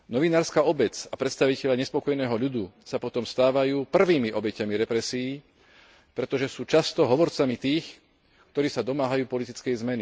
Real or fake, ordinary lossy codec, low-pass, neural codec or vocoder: real; none; none; none